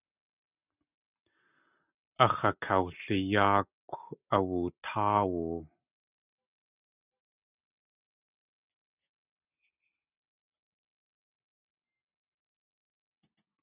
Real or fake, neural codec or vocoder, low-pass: real; none; 3.6 kHz